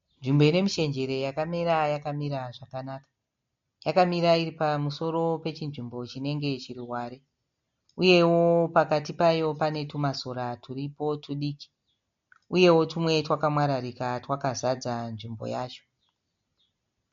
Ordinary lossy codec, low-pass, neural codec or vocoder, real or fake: MP3, 48 kbps; 7.2 kHz; none; real